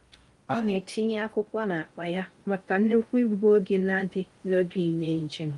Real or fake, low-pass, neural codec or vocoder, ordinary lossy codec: fake; 10.8 kHz; codec, 16 kHz in and 24 kHz out, 0.6 kbps, FocalCodec, streaming, 4096 codes; Opus, 32 kbps